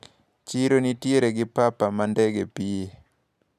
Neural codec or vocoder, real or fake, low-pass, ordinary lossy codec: none; real; 14.4 kHz; none